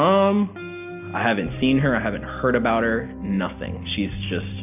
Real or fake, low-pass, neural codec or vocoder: real; 3.6 kHz; none